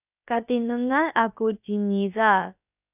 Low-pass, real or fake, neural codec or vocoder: 3.6 kHz; fake; codec, 16 kHz, about 1 kbps, DyCAST, with the encoder's durations